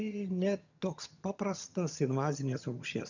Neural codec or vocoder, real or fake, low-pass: vocoder, 22.05 kHz, 80 mel bands, HiFi-GAN; fake; 7.2 kHz